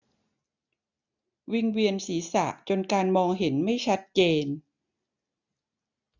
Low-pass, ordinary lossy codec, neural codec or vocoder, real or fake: 7.2 kHz; none; none; real